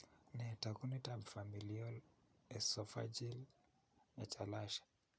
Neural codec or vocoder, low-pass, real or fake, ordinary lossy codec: none; none; real; none